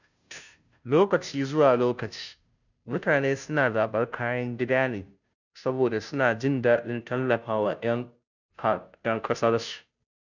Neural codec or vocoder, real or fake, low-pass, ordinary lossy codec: codec, 16 kHz, 0.5 kbps, FunCodec, trained on Chinese and English, 25 frames a second; fake; 7.2 kHz; none